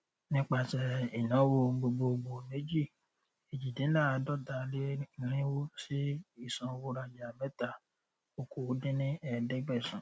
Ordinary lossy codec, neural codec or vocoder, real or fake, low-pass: none; none; real; none